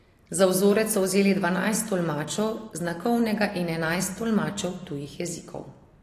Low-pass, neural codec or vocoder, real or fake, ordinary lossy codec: 14.4 kHz; none; real; AAC, 48 kbps